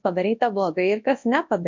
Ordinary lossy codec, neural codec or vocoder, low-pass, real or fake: MP3, 48 kbps; codec, 24 kHz, 0.9 kbps, WavTokenizer, large speech release; 7.2 kHz; fake